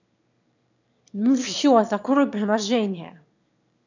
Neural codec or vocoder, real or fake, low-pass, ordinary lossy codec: autoencoder, 22.05 kHz, a latent of 192 numbers a frame, VITS, trained on one speaker; fake; 7.2 kHz; none